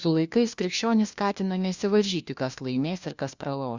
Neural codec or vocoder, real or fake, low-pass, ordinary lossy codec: codec, 16 kHz, 1 kbps, FunCodec, trained on LibriTTS, 50 frames a second; fake; 7.2 kHz; Opus, 64 kbps